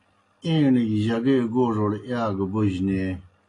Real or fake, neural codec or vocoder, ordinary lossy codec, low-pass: real; none; AAC, 32 kbps; 10.8 kHz